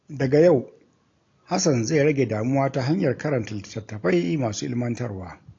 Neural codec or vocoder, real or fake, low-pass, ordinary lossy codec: none; real; 7.2 kHz; AAC, 48 kbps